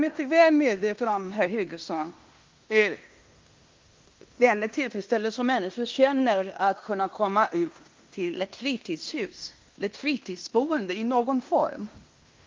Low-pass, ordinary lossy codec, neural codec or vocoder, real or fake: 7.2 kHz; Opus, 32 kbps; codec, 16 kHz in and 24 kHz out, 0.9 kbps, LongCat-Audio-Codec, fine tuned four codebook decoder; fake